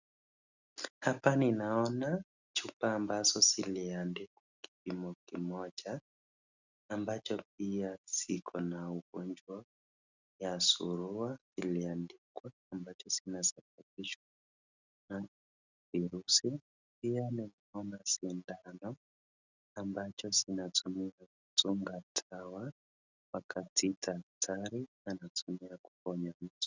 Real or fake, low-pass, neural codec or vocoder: real; 7.2 kHz; none